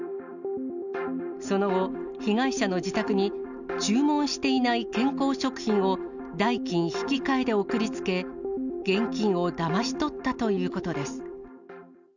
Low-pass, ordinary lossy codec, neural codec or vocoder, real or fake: 7.2 kHz; none; none; real